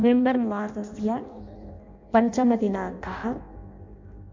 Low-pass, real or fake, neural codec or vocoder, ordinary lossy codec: 7.2 kHz; fake; codec, 16 kHz in and 24 kHz out, 0.6 kbps, FireRedTTS-2 codec; MP3, 48 kbps